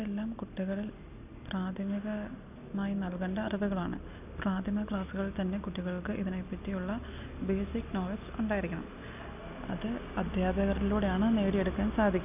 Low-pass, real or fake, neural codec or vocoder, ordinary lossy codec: 3.6 kHz; real; none; none